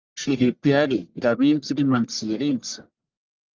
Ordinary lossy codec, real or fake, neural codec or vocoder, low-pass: Opus, 32 kbps; fake; codec, 44.1 kHz, 1.7 kbps, Pupu-Codec; 7.2 kHz